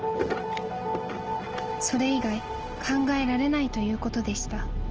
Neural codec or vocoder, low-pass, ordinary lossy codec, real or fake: none; 7.2 kHz; Opus, 16 kbps; real